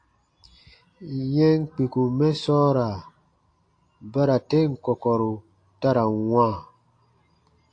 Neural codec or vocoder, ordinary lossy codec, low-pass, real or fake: none; AAC, 48 kbps; 9.9 kHz; real